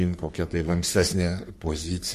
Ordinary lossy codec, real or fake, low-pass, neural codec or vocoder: MP3, 64 kbps; fake; 14.4 kHz; codec, 44.1 kHz, 3.4 kbps, Pupu-Codec